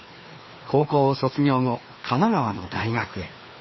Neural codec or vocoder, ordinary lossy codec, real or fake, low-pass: codec, 16 kHz, 2 kbps, FreqCodec, larger model; MP3, 24 kbps; fake; 7.2 kHz